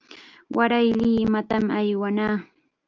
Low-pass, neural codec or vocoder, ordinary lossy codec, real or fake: 7.2 kHz; none; Opus, 24 kbps; real